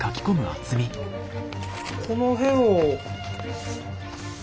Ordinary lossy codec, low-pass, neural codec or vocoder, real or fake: none; none; none; real